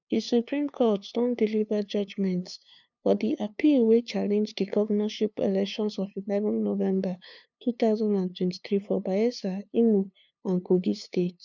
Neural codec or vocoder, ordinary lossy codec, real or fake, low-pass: codec, 16 kHz, 2 kbps, FunCodec, trained on LibriTTS, 25 frames a second; none; fake; 7.2 kHz